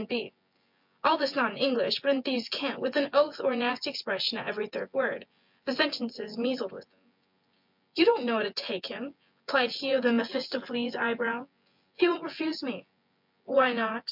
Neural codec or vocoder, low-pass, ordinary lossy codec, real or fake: vocoder, 24 kHz, 100 mel bands, Vocos; 5.4 kHz; MP3, 48 kbps; fake